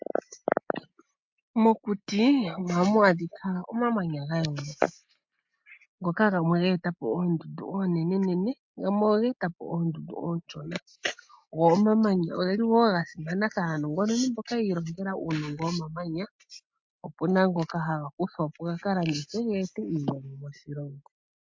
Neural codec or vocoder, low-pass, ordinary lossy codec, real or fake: none; 7.2 kHz; MP3, 48 kbps; real